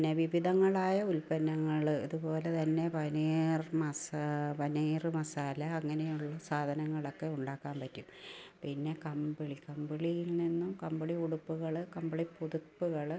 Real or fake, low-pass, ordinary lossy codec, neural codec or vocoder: real; none; none; none